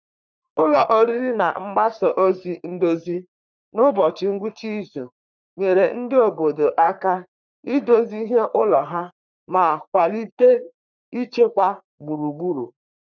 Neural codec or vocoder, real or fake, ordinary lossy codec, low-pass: codec, 44.1 kHz, 3.4 kbps, Pupu-Codec; fake; none; 7.2 kHz